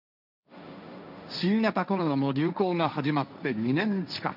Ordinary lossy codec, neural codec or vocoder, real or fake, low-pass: none; codec, 16 kHz, 1.1 kbps, Voila-Tokenizer; fake; 5.4 kHz